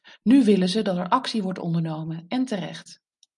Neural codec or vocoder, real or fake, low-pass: none; real; 10.8 kHz